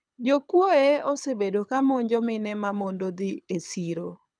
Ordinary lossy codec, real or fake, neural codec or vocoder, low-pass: none; fake; codec, 24 kHz, 6 kbps, HILCodec; 9.9 kHz